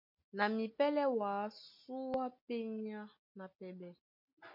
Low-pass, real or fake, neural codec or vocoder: 5.4 kHz; real; none